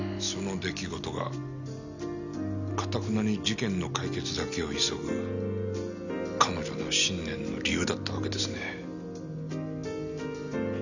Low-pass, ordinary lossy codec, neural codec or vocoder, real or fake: 7.2 kHz; none; none; real